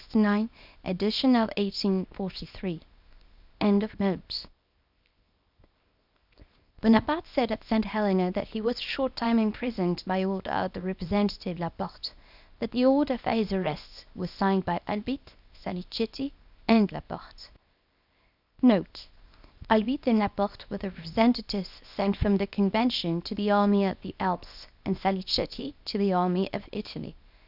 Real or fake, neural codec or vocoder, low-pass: fake; codec, 24 kHz, 0.9 kbps, WavTokenizer, small release; 5.4 kHz